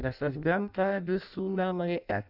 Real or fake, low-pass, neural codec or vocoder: fake; 5.4 kHz; codec, 16 kHz in and 24 kHz out, 0.6 kbps, FireRedTTS-2 codec